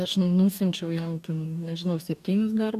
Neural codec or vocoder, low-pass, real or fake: codec, 44.1 kHz, 2.6 kbps, DAC; 14.4 kHz; fake